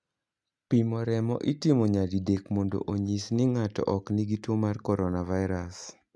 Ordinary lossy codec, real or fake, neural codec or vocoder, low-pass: none; real; none; none